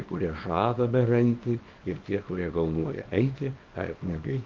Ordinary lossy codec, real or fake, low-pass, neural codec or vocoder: Opus, 24 kbps; fake; 7.2 kHz; codec, 24 kHz, 0.9 kbps, WavTokenizer, small release